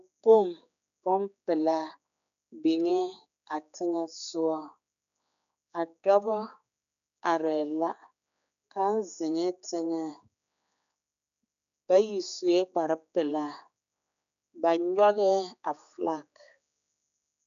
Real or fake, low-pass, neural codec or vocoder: fake; 7.2 kHz; codec, 16 kHz, 4 kbps, X-Codec, HuBERT features, trained on general audio